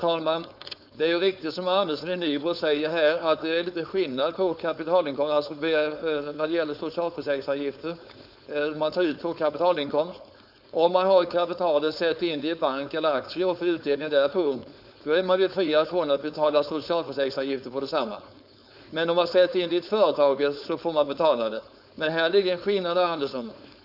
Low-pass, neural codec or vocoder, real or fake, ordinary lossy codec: 5.4 kHz; codec, 16 kHz, 4.8 kbps, FACodec; fake; none